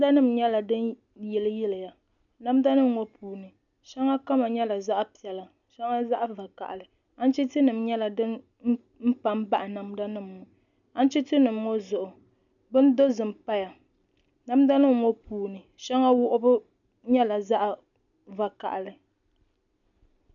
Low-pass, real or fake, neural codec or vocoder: 7.2 kHz; real; none